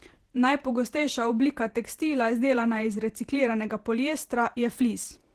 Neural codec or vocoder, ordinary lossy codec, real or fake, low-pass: vocoder, 48 kHz, 128 mel bands, Vocos; Opus, 16 kbps; fake; 14.4 kHz